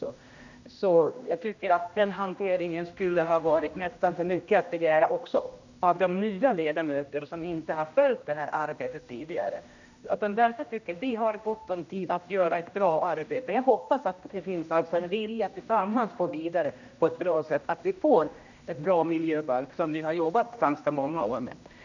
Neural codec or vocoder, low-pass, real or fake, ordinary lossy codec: codec, 16 kHz, 1 kbps, X-Codec, HuBERT features, trained on general audio; 7.2 kHz; fake; none